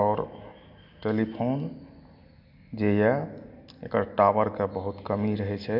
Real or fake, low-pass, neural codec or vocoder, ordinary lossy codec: real; 5.4 kHz; none; none